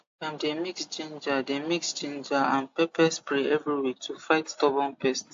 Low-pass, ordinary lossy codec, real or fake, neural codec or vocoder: 7.2 kHz; AAC, 48 kbps; real; none